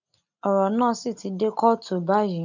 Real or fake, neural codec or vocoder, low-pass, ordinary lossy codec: real; none; 7.2 kHz; none